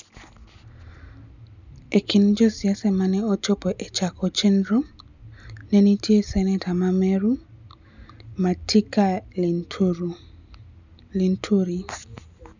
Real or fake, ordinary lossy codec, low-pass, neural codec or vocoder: real; none; 7.2 kHz; none